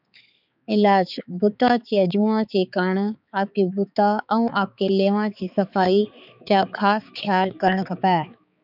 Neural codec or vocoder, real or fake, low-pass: codec, 16 kHz, 4 kbps, X-Codec, HuBERT features, trained on balanced general audio; fake; 5.4 kHz